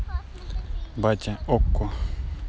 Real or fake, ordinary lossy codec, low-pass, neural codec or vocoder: real; none; none; none